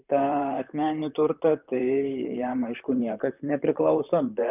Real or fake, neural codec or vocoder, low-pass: fake; vocoder, 44.1 kHz, 128 mel bands, Pupu-Vocoder; 3.6 kHz